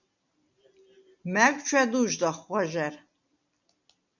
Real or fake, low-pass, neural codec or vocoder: real; 7.2 kHz; none